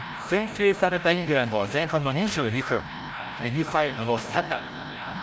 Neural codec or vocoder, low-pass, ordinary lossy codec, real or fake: codec, 16 kHz, 0.5 kbps, FreqCodec, larger model; none; none; fake